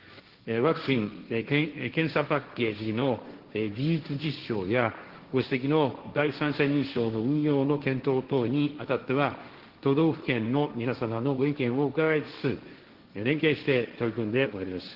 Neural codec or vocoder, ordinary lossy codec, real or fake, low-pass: codec, 16 kHz, 1.1 kbps, Voila-Tokenizer; Opus, 16 kbps; fake; 5.4 kHz